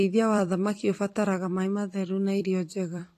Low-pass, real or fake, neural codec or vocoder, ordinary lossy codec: 14.4 kHz; fake; vocoder, 44.1 kHz, 128 mel bands every 256 samples, BigVGAN v2; AAC, 48 kbps